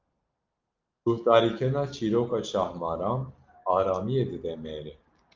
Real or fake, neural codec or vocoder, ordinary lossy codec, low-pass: real; none; Opus, 24 kbps; 7.2 kHz